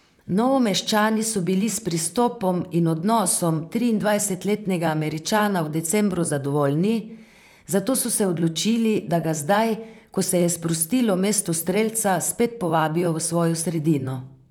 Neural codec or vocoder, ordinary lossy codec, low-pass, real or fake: vocoder, 44.1 kHz, 128 mel bands, Pupu-Vocoder; none; 19.8 kHz; fake